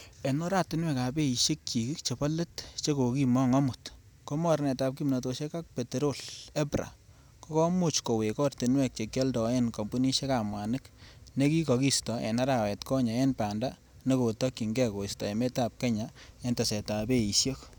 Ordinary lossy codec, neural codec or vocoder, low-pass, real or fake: none; none; none; real